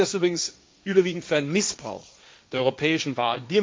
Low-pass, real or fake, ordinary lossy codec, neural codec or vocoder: none; fake; none; codec, 16 kHz, 1.1 kbps, Voila-Tokenizer